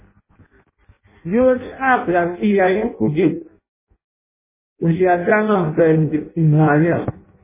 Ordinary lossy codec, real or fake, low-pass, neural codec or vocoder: MP3, 16 kbps; fake; 3.6 kHz; codec, 16 kHz in and 24 kHz out, 0.6 kbps, FireRedTTS-2 codec